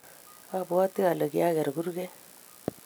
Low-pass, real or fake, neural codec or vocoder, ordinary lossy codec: none; real; none; none